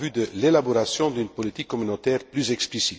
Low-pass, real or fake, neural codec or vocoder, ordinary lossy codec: none; real; none; none